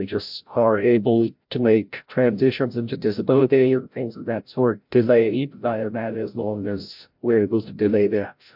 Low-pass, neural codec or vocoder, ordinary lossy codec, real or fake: 5.4 kHz; codec, 16 kHz, 0.5 kbps, FreqCodec, larger model; MP3, 48 kbps; fake